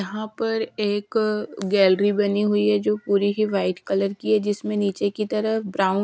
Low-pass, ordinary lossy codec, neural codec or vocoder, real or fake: none; none; none; real